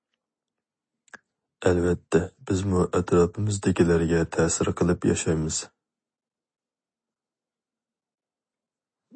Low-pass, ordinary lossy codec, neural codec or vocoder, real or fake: 9.9 kHz; MP3, 32 kbps; none; real